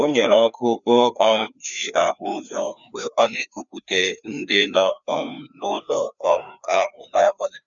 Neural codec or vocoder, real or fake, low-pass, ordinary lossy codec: codec, 16 kHz, 2 kbps, FreqCodec, larger model; fake; 7.2 kHz; none